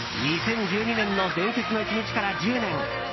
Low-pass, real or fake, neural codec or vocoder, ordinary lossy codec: 7.2 kHz; real; none; MP3, 24 kbps